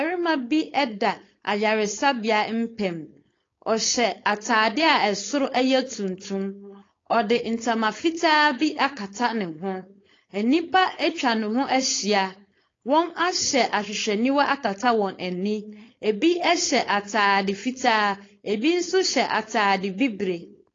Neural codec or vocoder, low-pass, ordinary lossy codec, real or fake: codec, 16 kHz, 4.8 kbps, FACodec; 7.2 kHz; AAC, 32 kbps; fake